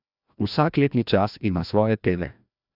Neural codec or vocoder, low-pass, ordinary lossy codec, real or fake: codec, 16 kHz, 1 kbps, FreqCodec, larger model; 5.4 kHz; none; fake